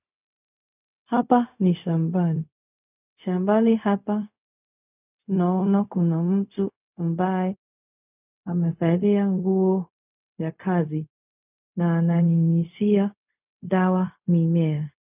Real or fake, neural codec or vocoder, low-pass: fake; codec, 16 kHz, 0.4 kbps, LongCat-Audio-Codec; 3.6 kHz